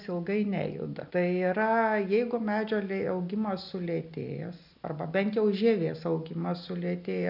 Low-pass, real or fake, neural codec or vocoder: 5.4 kHz; real; none